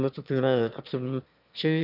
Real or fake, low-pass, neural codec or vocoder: fake; 5.4 kHz; autoencoder, 22.05 kHz, a latent of 192 numbers a frame, VITS, trained on one speaker